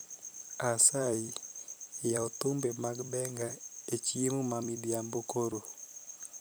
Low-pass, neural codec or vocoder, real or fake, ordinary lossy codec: none; vocoder, 44.1 kHz, 128 mel bands every 256 samples, BigVGAN v2; fake; none